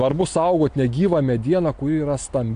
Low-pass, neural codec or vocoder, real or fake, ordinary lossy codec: 9.9 kHz; none; real; AAC, 64 kbps